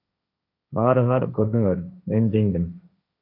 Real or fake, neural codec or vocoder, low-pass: fake; codec, 16 kHz, 1.1 kbps, Voila-Tokenizer; 5.4 kHz